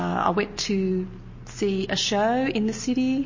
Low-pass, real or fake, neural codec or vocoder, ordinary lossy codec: 7.2 kHz; real; none; MP3, 32 kbps